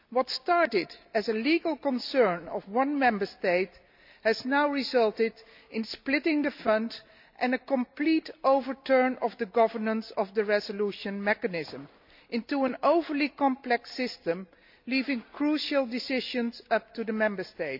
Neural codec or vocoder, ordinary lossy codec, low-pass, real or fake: none; MP3, 48 kbps; 5.4 kHz; real